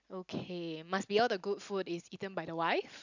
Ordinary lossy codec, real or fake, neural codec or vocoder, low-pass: none; real; none; 7.2 kHz